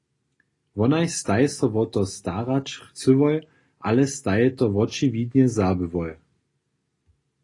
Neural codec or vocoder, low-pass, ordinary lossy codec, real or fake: none; 10.8 kHz; AAC, 32 kbps; real